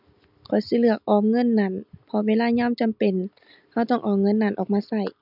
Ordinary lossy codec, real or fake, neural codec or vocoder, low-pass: none; real; none; 5.4 kHz